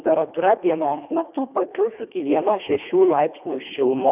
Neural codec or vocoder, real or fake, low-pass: codec, 24 kHz, 1.5 kbps, HILCodec; fake; 3.6 kHz